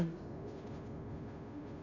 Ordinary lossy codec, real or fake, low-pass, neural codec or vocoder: none; fake; 7.2 kHz; codec, 16 kHz, 0.5 kbps, FunCodec, trained on Chinese and English, 25 frames a second